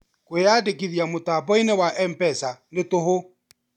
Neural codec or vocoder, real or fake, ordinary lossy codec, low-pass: none; real; none; 19.8 kHz